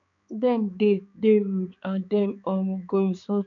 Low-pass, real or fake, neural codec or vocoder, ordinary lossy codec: 7.2 kHz; fake; codec, 16 kHz, 4 kbps, X-Codec, HuBERT features, trained on balanced general audio; none